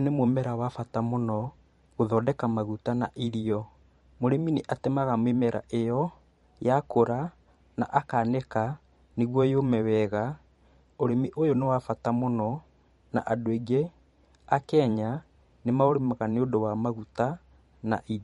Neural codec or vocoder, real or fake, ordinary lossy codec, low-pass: vocoder, 44.1 kHz, 128 mel bands every 512 samples, BigVGAN v2; fake; MP3, 48 kbps; 19.8 kHz